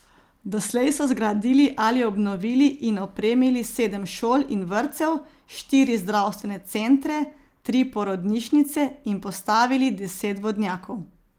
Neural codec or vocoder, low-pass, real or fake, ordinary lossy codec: none; 19.8 kHz; real; Opus, 24 kbps